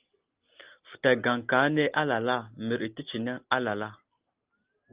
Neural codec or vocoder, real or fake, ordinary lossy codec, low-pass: none; real; Opus, 32 kbps; 3.6 kHz